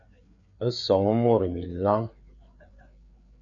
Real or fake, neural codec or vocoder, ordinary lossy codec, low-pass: fake; codec, 16 kHz, 4 kbps, FreqCodec, larger model; AAC, 48 kbps; 7.2 kHz